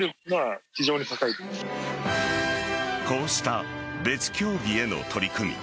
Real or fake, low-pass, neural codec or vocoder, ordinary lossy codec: real; none; none; none